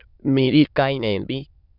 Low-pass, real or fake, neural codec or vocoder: 5.4 kHz; fake; autoencoder, 22.05 kHz, a latent of 192 numbers a frame, VITS, trained on many speakers